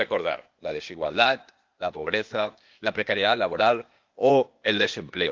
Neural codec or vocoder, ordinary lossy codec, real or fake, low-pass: codec, 16 kHz, 0.8 kbps, ZipCodec; Opus, 24 kbps; fake; 7.2 kHz